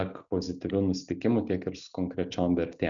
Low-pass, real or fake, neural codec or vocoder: 7.2 kHz; real; none